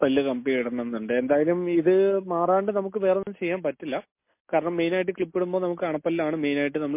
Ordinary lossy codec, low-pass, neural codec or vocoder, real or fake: MP3, 24 kbps; 3.6 kHz; none; real